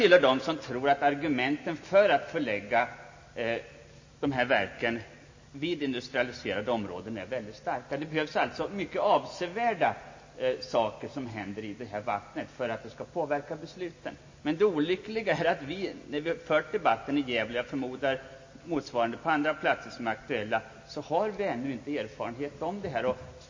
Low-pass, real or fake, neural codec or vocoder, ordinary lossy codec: 7.2 kHz; real; none; MP3, 32 kbps